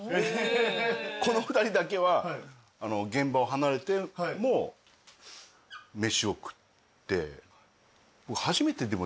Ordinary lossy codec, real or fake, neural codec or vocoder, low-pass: none; real; none; none